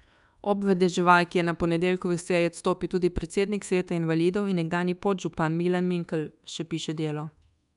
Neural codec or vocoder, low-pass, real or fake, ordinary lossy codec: codec, 24 kHz, 1.2 kbps, DualCodec; 10.8 kHz; fake; none